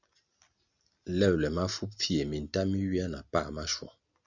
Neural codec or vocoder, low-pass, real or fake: none; 7.2 kHz; real